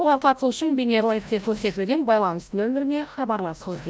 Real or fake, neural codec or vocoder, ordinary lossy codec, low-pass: fake; codec, 16 kHz, 0.5 kbps, FreqCodec, larger model; none; none